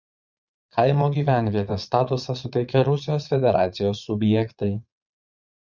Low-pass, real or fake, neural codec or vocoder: 7.2 kHz; fake; vocoder, 22.05 kHz, 80 mel bands, Vocos